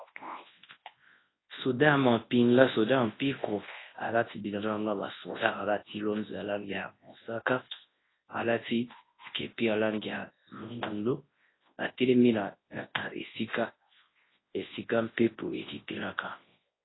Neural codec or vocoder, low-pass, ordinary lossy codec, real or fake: codec, 24 kHz, 0.9 kbps, WavTokenizer, large speech release; 7.2 kHz; AAC, 16 kbps; fake